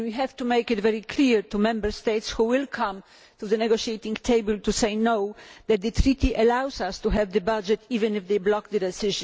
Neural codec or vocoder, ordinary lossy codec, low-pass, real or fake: none; none; none; real